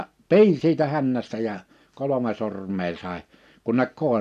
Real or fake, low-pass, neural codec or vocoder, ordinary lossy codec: real; 14.4 kHz; none; none